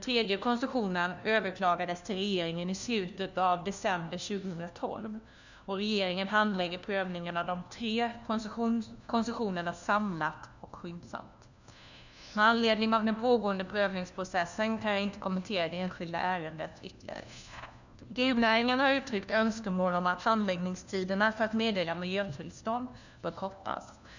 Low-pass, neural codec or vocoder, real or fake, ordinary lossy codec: 7.2 kHz; codec, 16 kHz, 1 kbps, FunCodec, trained on LibriTTS, 50 frames a second; fake; none